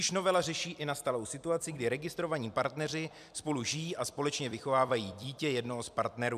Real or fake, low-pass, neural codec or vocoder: fake; 14.4 kHz; vocoder, 44.1 kHz, 128 mel bands every 256 samples, BigVGAN v2